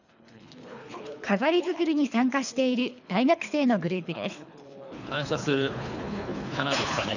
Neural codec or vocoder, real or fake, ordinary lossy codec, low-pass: codec, 24 kHz, 3 kbps, HILCodec; fake; none; 7.2 kHz